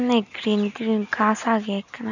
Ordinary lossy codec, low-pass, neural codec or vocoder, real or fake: none; 7.2 kHz; none; real